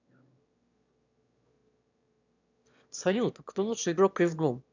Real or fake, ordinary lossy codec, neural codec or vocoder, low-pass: fake; none; autoencoder, 22.05 kHz, a latent of 192 numbers a frame, VITS, trained on one speaker; 7.2 kHz